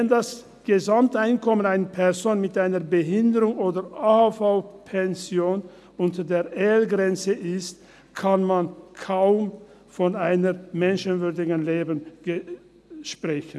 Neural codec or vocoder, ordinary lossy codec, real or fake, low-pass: none; none; real; none